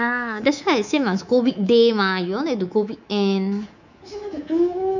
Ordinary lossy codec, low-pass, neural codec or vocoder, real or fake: none; 7.2 kHz; codec, 24 kHz, 3.1 kbps, DualCodec; fake